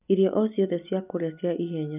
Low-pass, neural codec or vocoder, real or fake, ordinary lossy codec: 3.6 kHz; none; real; none